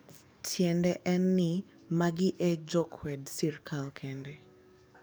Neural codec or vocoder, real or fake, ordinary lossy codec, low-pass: codec, 44.1 kHz, 7.8 kbps, DAC; fake; none; none